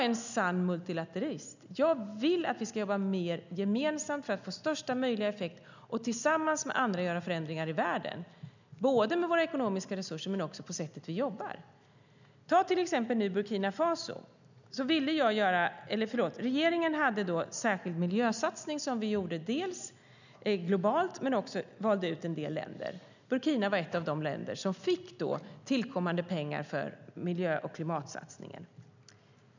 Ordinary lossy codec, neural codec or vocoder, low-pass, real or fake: none; none; 7.2 kHz; real